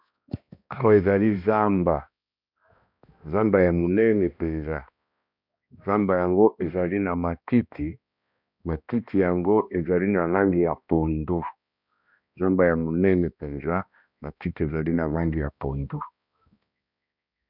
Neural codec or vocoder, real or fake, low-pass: codec, 16 kHz, 1 kbps, X-Codec, HuBERT features, trained on balanced general audio; fake; 5.4 kHz